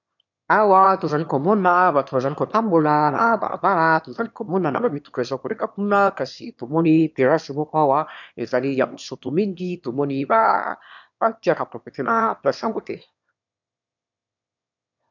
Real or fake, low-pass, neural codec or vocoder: fake; 7.2 kHz; autoencoder, 22.05 kHz, a latent of 192 numbers a frame, VITS, trained on one speaker